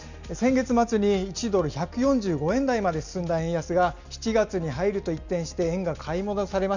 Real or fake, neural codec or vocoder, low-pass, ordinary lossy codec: real; none; 7.2 kHz; none